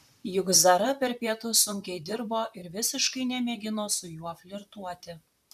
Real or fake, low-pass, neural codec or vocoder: fake; 14.4 kHz; vocoder, 44.1 kHz, 128 mel bands, Pupu-Vocoder